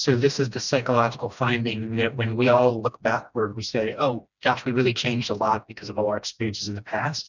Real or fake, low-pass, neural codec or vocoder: fake; 7.2 kHz; codec, 16 kHz, 1 kbps, FreqCodec, smaller model